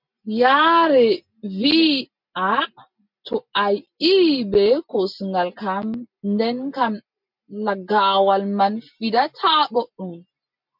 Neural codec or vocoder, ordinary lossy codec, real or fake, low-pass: none; MP3, 48 kbps; real; 5.4 kHz